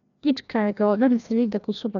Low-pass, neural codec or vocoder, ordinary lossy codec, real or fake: 7.2 kHz; codec, 16 kHz, 1 kbps, FreqCodec, larger model; none; fake